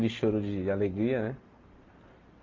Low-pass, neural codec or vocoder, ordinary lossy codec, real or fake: 7.2 kHz; none; Opus, 32 kbps; real